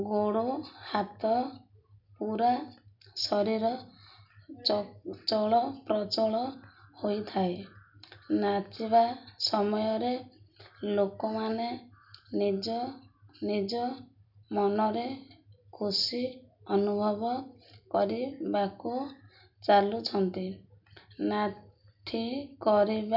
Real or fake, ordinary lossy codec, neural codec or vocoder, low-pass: real; none; none; 5.4 kHz